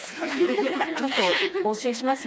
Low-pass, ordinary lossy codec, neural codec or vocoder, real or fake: none; none; codec, 16 kHz, 2 kbps, FreqCodec, smaller model; fake